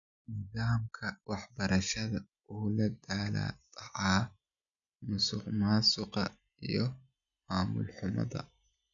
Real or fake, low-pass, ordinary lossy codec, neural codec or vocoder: real; 7.2 kHz; MP3, 96 kbps; none